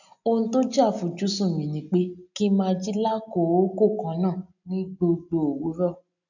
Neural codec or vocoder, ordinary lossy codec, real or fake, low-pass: none; none; real; 7.2 kHz